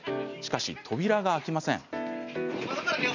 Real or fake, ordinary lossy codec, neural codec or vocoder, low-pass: real; none; none; 7.2 kHz